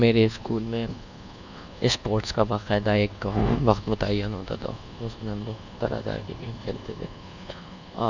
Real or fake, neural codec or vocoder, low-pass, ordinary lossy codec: fake; codec, 24 kHz, 1.2 kbps, DualCodec; 7.2 kHz; none